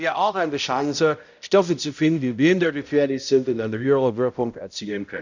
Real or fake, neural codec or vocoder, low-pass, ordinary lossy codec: fake; codec, 16 kHz, 0.5 kbps, X-Codec, HuBERT features, trained on balanced general audio; 7.2 kHz; none